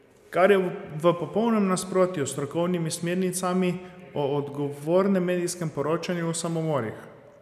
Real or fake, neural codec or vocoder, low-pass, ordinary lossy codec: real; none; 14.4 kHz; none